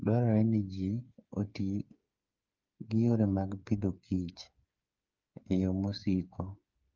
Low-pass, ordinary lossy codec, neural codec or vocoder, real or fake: 7.2 kHz; Opus, 24 kbps; codec, 16 kHz, 8 kbps, FreqCodec, smaller model; fake